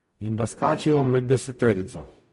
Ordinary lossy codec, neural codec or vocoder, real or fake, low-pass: MP3, 48 kbps; codec, 44.1 kHz, 0.9 kbps, DAC; fake; 14.4 kHz